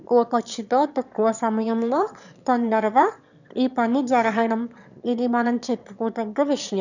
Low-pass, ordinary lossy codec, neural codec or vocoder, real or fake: 7.2 kHz; none; autoencoder, 22.05 kHz, a latent of 192 numbers a frame, VITS, trained on one speaker; fake